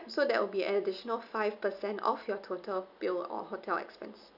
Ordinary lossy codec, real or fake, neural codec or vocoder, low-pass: none; real; none; 5.4 kHz